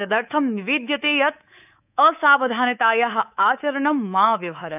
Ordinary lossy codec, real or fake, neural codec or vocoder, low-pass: none; fake; codec, 24 kHz, 3.1 kbps, DualCodec; 3.6 kHz